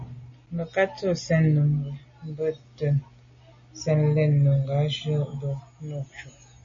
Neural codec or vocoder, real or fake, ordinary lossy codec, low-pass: none; real; MP3, 32 kbps; 7.2 kHz